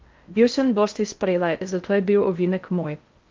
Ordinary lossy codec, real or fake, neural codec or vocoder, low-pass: Opus, 24 kbps; fake; codec, 16 kHz in and 24 kHz out, 0.6 kbps, FocalCodec, streaming, 4096 codes; 7.2 kHz